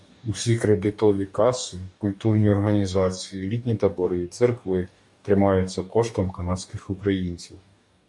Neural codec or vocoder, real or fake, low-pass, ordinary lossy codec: codec, 44.1 kHz, 2.6 kbps, DAC; fake; 10.8 kHz; MP3, 96 kbps